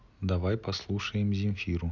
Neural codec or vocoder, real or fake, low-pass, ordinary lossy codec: none; real; 7.2 kHz; none